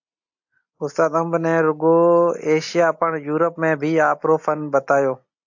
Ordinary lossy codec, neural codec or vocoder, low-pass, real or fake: AAC, 48 kbps; none; 7.2 kHz; real